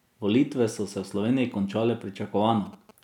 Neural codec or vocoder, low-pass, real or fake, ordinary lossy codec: none; 19.8 kHz; real; none